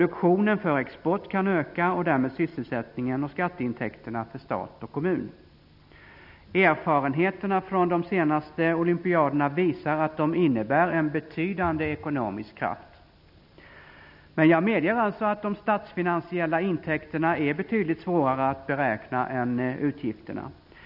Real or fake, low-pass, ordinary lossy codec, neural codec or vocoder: real; 5.4 kHz; none; none